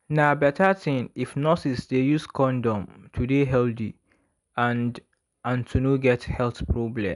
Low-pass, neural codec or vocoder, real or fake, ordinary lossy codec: 10.8 kHz; none; real; none